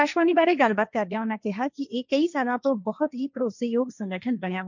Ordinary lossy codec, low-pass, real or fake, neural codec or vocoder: none; 7.2 kHz; fake; codec, 16 kHz, 1.1 kbps, Voila-Tokenizer